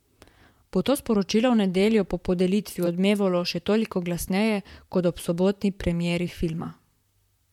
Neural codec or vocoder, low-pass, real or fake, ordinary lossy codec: vocoder, 44.1 kHz, 128 mel bands, Pupu-Vocoder; 19.8 kHz; fake; MP3, 96 kbps